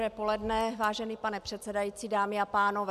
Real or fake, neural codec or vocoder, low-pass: real; none; 14.4 kHz